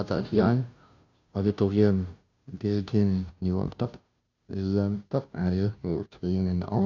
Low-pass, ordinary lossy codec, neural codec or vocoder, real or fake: 7.2 kHz; none; codec, 16 kHz, 0.5 kbps, FunCodec, trained on Chinese and English, 25 frames a second; fake